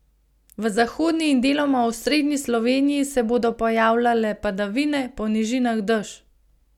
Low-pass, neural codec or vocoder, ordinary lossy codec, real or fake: 19.8 kHz; none; none; real